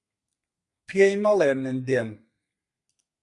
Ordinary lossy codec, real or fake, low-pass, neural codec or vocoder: Opus, 64 kbps; fake; 10.8 kHz; codec, 32 kHz, 1.9 kbps, SNAC